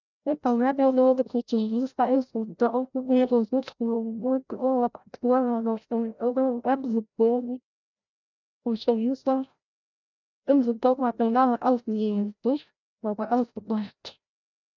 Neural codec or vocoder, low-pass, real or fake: codec, 16 kHz, 0.5 kbps, FreqCodec, larger model; 7.2 kHz; fake